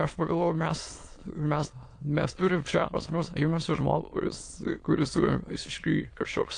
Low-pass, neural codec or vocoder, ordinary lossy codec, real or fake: 9.9 kHz; autoencoder, 22.05 kHz, a latent of 192 numbers a frame, VITS, trained on many speakers; AAC, 48 kbps; fake